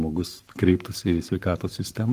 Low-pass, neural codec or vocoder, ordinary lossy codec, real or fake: 14.4 kHz; codec, 44.1 kHz, 7.8 kbps, Pupu-Codec; Opus, 24 kbps; fake